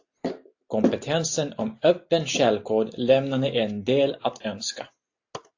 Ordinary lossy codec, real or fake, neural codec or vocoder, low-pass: AAC, 48 kbps; real; none; 7.2 kHz